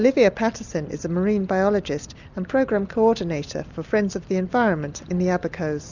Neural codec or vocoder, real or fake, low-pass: none; real; 7.2 kHz